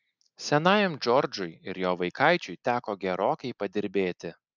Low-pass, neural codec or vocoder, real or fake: 7.2 kHz; none; real